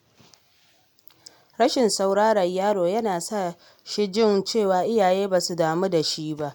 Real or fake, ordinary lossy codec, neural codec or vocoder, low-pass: real; none; none; none